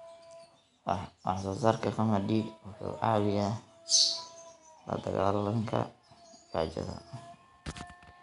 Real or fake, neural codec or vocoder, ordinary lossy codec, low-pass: real; none; none; 10.8 kHz